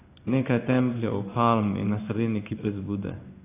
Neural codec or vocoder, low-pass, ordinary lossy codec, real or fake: codec, 16 kHz in and 24 kHz out, 1 kbps, XY-Tokenizer; 3.6 kHz; AAC, 24 kbps; fake